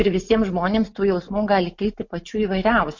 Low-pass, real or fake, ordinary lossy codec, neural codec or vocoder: 7.2 kHz; real; MP3, 48 kbps; none